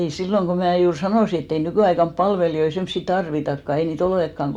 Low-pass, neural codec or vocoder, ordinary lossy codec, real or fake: 19.8 kHz; none; none; real